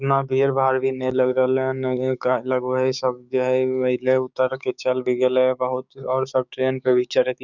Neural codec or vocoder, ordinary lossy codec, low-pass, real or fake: codec, 16 kHz, 6 kbps, DAC; none; 7.2 kHz; fake